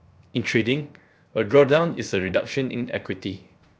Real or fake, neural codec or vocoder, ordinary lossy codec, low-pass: fake; codec, 16 kHz, 0.7 kbps, FocalCodec; none; none